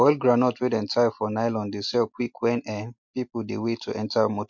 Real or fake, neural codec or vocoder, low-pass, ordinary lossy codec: real; none; 7.2 kHz; MP3, 48 kbps